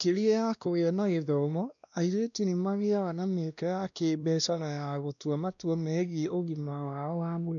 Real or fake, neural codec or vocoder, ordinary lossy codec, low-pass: fake; codec, 16 kHz, 1 kbps, X-Codec, WavLM features, trained on Multilingual LibriSpeech; none; 7.2 kHz